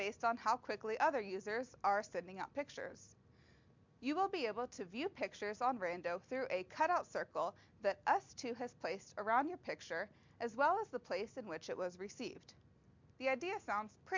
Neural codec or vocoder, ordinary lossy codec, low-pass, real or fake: none; MP3, 64 kbps; 7.2 kHz; real